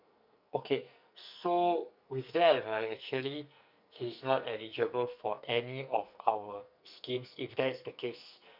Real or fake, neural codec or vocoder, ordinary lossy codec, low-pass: fake; codec, 44.1 kHz, 2.6 kbps, SNAC; none; 5.4 kHz